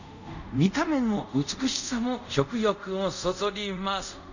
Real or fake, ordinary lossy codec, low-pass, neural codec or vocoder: fake; none; 7.2 kHz; codec, 24 kHz, 0.5 kbps, DualCodec